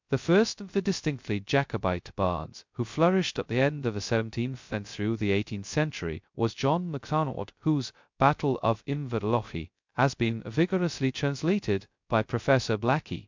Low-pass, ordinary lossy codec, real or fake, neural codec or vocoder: 7.2 kHz; MP3, 64 kbps; fake; codec, 16 kHz, 0.2 kbps, FocalCodec